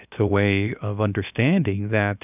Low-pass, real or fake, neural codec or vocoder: 3.6 kHz; fake; codec, 16 kHz, 0.7 kbps, FocalCodec